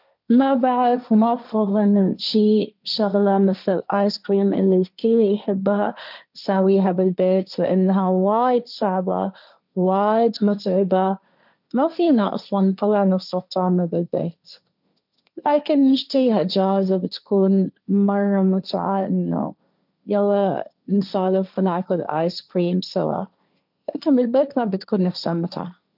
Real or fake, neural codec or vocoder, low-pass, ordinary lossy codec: fake; codec, 16 kHz, 1.1 kbps, Voila-Tokenizer; 5.4 kHz; none